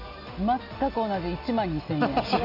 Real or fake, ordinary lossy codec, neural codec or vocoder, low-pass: real; none; none; 5.4 kHz